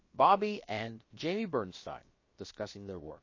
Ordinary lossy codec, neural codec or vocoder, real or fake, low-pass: MP3, 32 kbps; codec, 16 kHz, about 1 kbps, DyCAST, with the encoder's durations; fake; 7.2 kHz